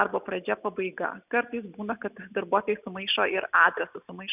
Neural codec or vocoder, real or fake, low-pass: none; real; 3.6 kHz